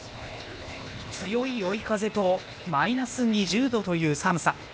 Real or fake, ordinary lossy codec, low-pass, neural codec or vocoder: fake; none; none; codec, 16 kHz, 0.8 kbps, ZipCodec